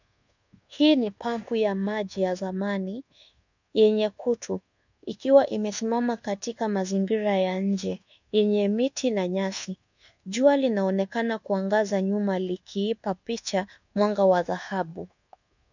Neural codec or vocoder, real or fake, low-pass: codec, 24 kHz, 1.2 kbps, DualCodec; fake; 7.2 kHz